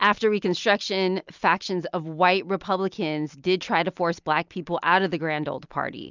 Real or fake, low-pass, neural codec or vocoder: real; 7.2 kHz; none